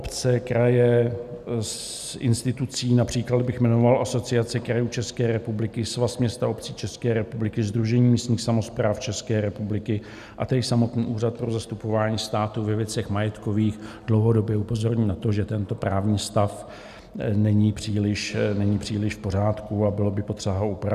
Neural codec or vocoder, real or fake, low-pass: none; real; 14.4 kHz